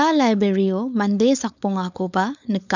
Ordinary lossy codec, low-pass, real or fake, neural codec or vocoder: none; 7.2 kHz; fake; codec, 16 kHz, 4.8 kbps, FACodec